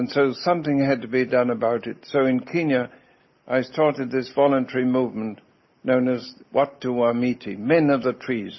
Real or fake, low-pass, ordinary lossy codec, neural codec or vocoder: real; 7.2 kHz; MP3, 24 kbps; none